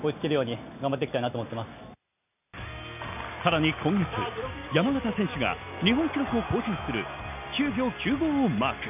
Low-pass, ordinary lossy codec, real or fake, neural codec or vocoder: 3.6 kHz; none; real; none